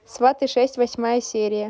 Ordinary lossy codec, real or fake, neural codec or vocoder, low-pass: none; real; none; none